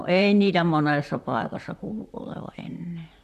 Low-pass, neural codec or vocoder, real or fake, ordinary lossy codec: 14.4 kHz; vocoder, 44.1 kHz, 128 mel bands, Pupu-Vocoder; fake; none